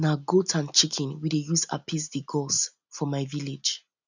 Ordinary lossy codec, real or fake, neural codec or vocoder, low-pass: none; real; none; 7.2 kHz